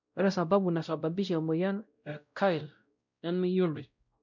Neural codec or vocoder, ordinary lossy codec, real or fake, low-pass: codec, 16 kHz, 0.5 kbps, X-Codec, WavLM features, trained on Multilingual LibriSpeech; none; fake; 7.2 kHz